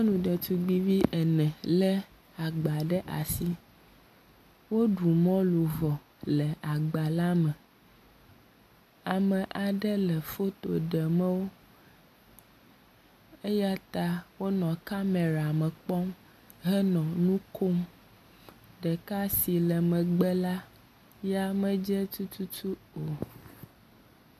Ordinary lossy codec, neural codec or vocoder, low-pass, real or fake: AAC, 96 kbps; none; 14.4 kHz; real